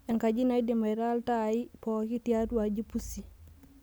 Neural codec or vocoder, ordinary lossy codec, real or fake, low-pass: none; none; real; none